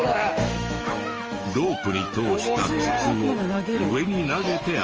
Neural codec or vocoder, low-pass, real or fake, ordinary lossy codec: autoencoder, 48 kHz, 128 numbers a frame, DAC-VAE, trained on Japanese speech; 7.2 kHz; fake; Opus, 24 kbps